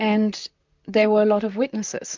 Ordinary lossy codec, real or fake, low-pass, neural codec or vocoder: MP3, 64 kbps; fake; 7.2 kHz; vocoder, 44.1 kHz, 128 mel bands, Pupu-Vocoder